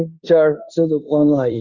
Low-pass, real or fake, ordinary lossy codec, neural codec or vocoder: 7.2 kHz; fake; Opus, 64 kbps; codec, 16 kHz in and 24 kHz out, 0.9 kbps, LongCat-Audio-Codec, fine tuned four codebook decoder